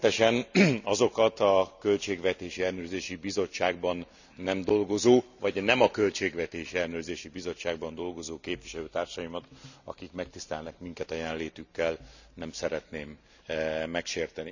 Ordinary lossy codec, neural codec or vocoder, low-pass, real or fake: none; none; 7.2 kHz; real